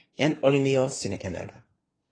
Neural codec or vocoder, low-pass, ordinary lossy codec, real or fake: codec, 24 kHz, 1 kbps, SNAC; 9.9 kHz; AAC, 32 kbps; fake